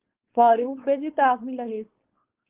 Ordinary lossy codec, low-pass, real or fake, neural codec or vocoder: Opus, 16 kbps; 3.6 kHz; fake; codec, 16 kHz, 4.8 kbps, FACodec